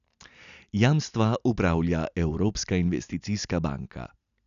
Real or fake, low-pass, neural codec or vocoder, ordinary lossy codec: real; 7.2 kHz; none; none